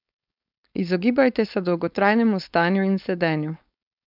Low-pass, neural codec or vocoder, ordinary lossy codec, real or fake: 5.4 kHz; codec, 16 kHz, 4.8 kbps, FACodec; none; fake